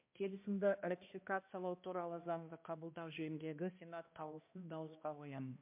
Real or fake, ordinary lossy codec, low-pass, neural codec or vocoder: fake; MP3, 32 kbps; 3.6 kHz; codec, 16 kHz, 1 kbps, X-Codec, HuBERT features, trained on balanced general audio